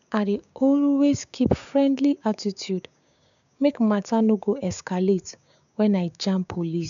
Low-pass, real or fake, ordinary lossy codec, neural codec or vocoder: 7.2 kHz; fake; none; codec, 16 kHz, 6 kbps, DAC